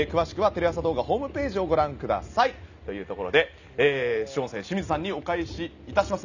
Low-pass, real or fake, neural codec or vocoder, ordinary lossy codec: 7.2 kHz; real; none; none